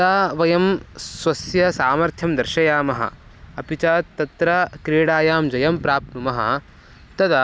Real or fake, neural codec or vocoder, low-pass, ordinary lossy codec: real; none; none; none